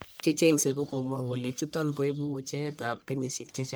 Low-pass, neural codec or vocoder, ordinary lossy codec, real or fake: none; codec, 44.1 kHz, 1.7 kbps, Pupu-Codec; none; fake